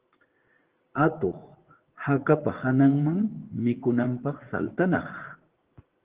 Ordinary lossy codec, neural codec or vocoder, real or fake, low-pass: Opus, 16 kbps; vocoder, 44.1 kHz, 80 mel bands, Vocos; fake; 3.6 kHz